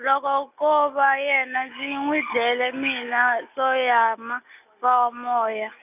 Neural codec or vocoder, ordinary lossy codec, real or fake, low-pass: none; none; real; 3.6 kHz